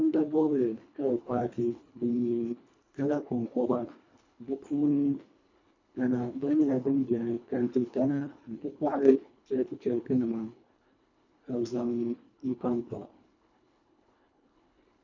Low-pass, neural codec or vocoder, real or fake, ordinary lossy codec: 7.2 kHz; codec, 24 kHz, 1.5 kbps, HILCodec; fake; MP3, 64 kbps